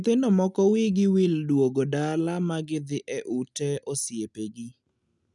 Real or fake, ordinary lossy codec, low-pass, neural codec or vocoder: real; none; 10.8 kHz; none